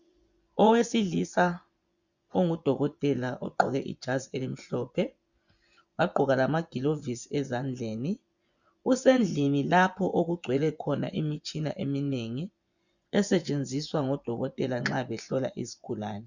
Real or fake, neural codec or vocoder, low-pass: fake; vocoder, 24 kHz, 100 mel bands, Vocos; 7.2 kHz